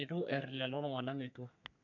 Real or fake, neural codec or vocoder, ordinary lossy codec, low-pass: fake; codec, 32 kHz, 1.9 kbps, SNAC; none; 7.2 kHz